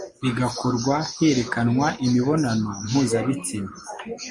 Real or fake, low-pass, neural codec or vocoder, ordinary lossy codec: real; 10.8 kHz; none; MP3, 48 kbps